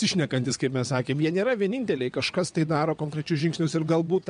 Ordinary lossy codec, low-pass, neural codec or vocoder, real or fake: MP3, 64 kbps; 9.9 kHz; vocoder, 22.05 kHz, 80 mel bands, WaveNeXt; fake